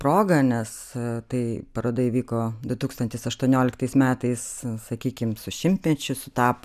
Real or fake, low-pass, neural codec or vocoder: real; 14.4 kHz; none